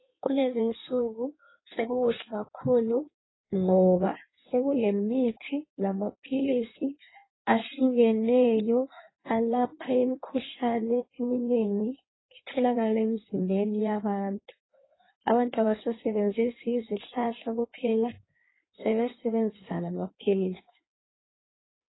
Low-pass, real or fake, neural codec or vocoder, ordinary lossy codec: 7.2 kHz; fake; codec, 16 kHz in and 24 kHz out, 1.1 kbps, FireRedTTS-2 codec; AAC, 16 kbps